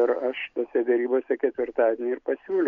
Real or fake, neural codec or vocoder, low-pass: real; none; 7.2 kHz